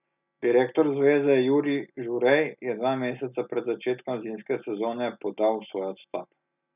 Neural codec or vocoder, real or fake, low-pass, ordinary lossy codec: none; real; 3.6 kHz; none